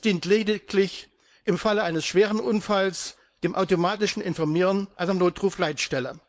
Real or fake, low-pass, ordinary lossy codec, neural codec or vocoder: fake; none; none; codec, 16 kHz, 4.8 kbps, FACodec